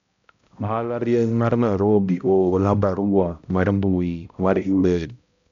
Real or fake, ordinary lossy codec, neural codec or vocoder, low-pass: fake; MP3, 64 kbps; codec, 16 kHz, 0.5 kbps, X-Codec, HuBERT features, trained on balanced general audio; 7.2 kHz